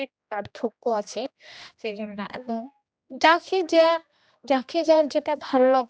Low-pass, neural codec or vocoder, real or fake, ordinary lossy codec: none; codec, 16 kHz, 1 kbps, X-Codec, HuBERT features, trained on general audio; fake; none